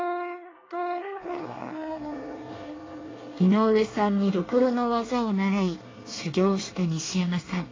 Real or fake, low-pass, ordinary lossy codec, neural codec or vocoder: fake; 7.2 kHz; AAC, 32 kbps; codec, 24 kHz, 1 kbps, SNAC